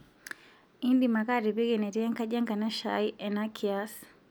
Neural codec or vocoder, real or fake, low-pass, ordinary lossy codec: none; real; none; none